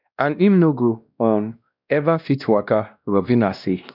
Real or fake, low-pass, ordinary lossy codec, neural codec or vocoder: fake; 5.4 kHz; none; codec, 16 kHz, 1 kbps, X-Codec, WavLM features, trained on Multilingual LibriSpeech